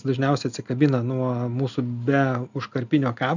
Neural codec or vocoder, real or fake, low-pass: none; real; 7.2 kHz